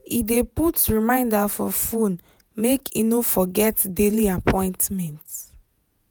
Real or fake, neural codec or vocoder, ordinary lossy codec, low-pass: fake; vocoder, 48 kHz, 128 mel bands, Vocos; none; none